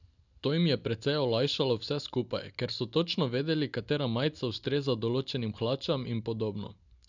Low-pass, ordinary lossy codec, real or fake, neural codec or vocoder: 7.2 kHz; none; real; none